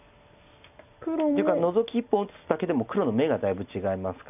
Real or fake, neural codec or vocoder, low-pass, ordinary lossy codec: real; none; 3.6 kHz; none